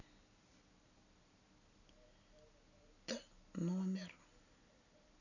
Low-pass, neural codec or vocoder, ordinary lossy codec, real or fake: 7.2 kHz; none; none; real